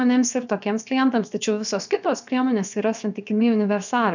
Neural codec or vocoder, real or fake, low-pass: codec, 16 kHz, about 1 kbps, DyCAST, with the encoder's durations; fake; 7.2 kHz